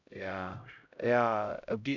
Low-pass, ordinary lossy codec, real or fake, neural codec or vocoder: 7.2 kHz; none; fake; codec, 16 kHz, 0.5 kbps, X-Codec, HuBERT features, trained on LibriSpeech